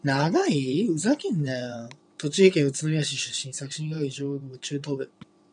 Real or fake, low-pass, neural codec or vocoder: fake; 9.9 kHz; vocoder, 22.05 kHz, 80 mel bands, WaveNeXt